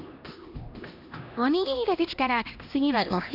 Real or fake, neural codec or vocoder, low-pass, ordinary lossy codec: fake; codec, 16 kHz, 1 kbps, X-Codec, HuBERT features, trained on LibriSpeech; 5.4 kHz; none